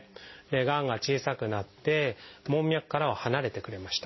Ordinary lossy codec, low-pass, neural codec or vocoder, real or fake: MP3, 24 kbps; 7.2 kHz; none; real